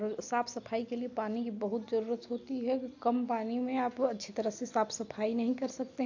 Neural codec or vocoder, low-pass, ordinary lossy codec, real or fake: none; 7.2 kHz; AAC, 48 kbps; real